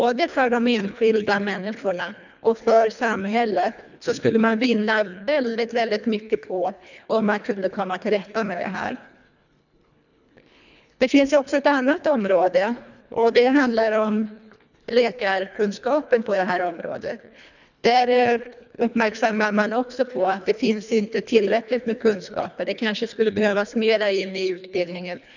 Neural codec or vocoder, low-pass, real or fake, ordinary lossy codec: codec, 24 kHz, 1.5 kbps, HILCodec; 7.2 kHz; fake; none